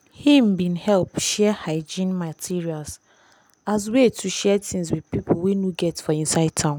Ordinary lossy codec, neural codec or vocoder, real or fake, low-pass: none; none; real; none